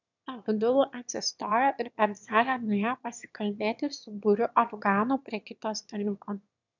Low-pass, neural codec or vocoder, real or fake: 7.2 kHz; autoencoder, 22.05 kHz, a latent of 192 numbers a frame, VITS, trained on one speaker; fake